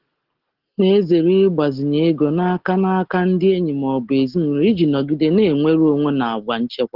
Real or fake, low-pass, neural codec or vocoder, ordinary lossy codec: real; 5.4 kHz; none; Opus, 16 kbps